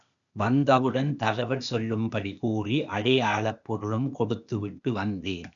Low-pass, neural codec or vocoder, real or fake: 7.2 kHz; codec, 16 kHz, 0.8 kbps, ZipCodec; fake